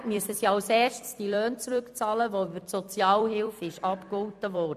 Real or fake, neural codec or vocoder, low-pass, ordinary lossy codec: fake; vocoder, 44.1 kHz, 128 mel bands every 256 samples, BigVGAN v2; 14.4 kHz; AAC, 96 kbps